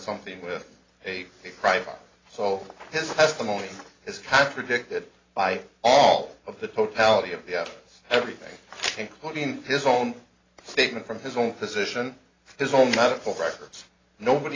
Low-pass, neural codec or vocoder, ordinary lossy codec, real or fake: 7.2 kHz; none; AAC, 32 kbps; real